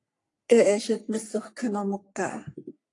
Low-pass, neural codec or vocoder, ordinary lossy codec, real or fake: 10.8 kHz; codec, 44.1 kHz, 3.4 kbps, Pupu-Codec; AAC, 48 kbps; fake